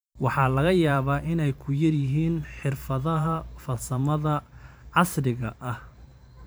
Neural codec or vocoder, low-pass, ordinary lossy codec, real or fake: vocoder, 44.1 kHz, 128 mel bands every 512 samples, BigVGAN v2; none; none; fake